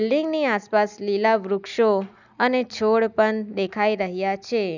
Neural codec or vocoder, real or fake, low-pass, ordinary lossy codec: none; real; 7.2 kHz; none